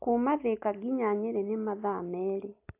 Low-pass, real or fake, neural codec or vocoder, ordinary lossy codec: 3.6 kHz; real; none; AAC, 24 kbps